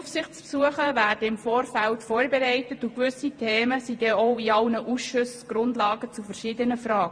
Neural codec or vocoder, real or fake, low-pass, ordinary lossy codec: vocoder, 48 kHz, 128 mel bands, Vocos; fake; 9.9 kHz; MP3, 48 kbps